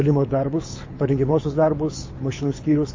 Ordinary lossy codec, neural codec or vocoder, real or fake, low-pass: MP3, 32 kbps; codec, 24 kHz, 6 kbps, HILCodec; fake; 7.2 kHz